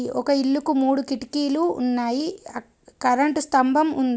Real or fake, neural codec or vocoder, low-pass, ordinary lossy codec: real; none; none; none